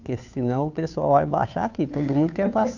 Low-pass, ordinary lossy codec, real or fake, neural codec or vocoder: 7.2 kHz; none; fake; codec, 16 kHz, 2 kbps, FunCodec, trained on Chinese and English, 25 frames a second